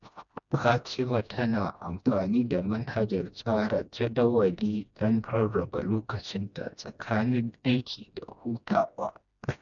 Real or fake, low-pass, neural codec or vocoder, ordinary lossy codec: fake; 7.2 kHz; codec, 16 kHz, 1 kbps, FreqCodec, smaller model; none